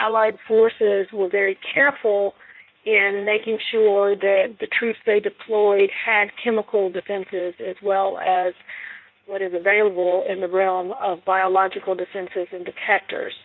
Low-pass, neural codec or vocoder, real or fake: 7.2 kHz; codec, 16 kHz in and 24 kHz out, 1.1 kbps, FireRedTTS-2 codec; fake